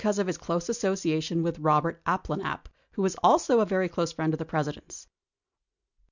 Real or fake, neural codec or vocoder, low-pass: real; none; 7.2 kHz